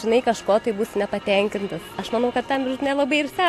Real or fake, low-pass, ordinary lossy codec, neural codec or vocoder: real; 14.4 kHz; AAC, 64 kbps; none